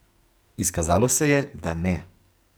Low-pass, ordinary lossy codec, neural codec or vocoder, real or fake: none; none; codec, 44.1 kHz, 2.6 kbps, SNAC; fake